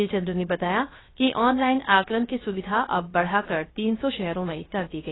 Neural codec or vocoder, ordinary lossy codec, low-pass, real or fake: codec, 16 kHz, 0.3 kbps, FocalCodec; AAC, 16 kbps; 7.2 kHz; fake